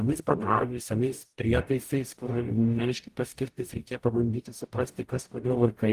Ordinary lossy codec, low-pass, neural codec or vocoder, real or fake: Opus, 24 kbps; 14.4 kHz; codec, 44.1 kHz, 0.9 kbps, DAC; fake